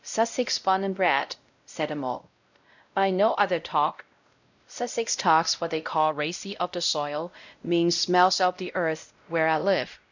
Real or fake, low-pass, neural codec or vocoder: fake; 7.2 kHz; codec, 16 kHz, 0.5 kbps, X-Codec, WavLM features, trained on Multilingual LibriSpeech